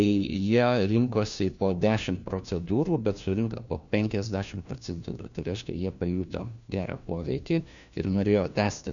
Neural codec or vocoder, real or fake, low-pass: codec, 16 kHz, 1 kbps, FunCodec, trained on LibriTTS, 50 frames a second; fake; 7.2 kHz